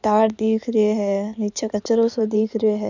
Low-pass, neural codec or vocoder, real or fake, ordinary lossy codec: 7.2 kHz; codec, 16 kHz in and 24 kHz out, 2.2 kbps, FireRedTTS-2 codec; fake; none